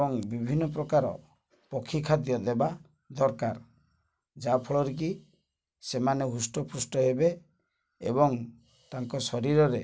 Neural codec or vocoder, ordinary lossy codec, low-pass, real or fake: none; none; none; real